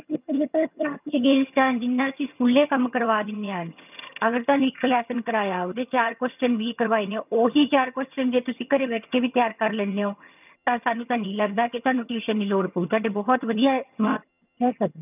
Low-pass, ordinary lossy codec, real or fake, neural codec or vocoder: 3.6 kHz; none; fake; vocoder, 22.05 kHz, 80 mel bands, HiFi-GAN